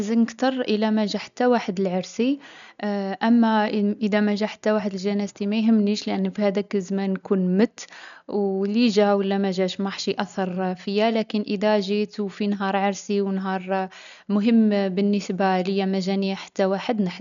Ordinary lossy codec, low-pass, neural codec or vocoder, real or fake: none; 7.2 kHz; none; real